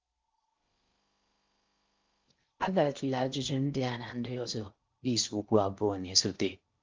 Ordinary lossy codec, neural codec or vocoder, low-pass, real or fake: Opus, 32 kbps; codec, 16 kHz in and 24 kHz out, 0.6 kbps, FocalCodec, streaming, 4096 codes; 7.2 kHz; fake